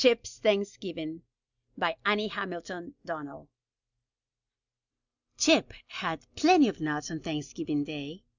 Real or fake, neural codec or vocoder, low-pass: real; none; 7.2 kHz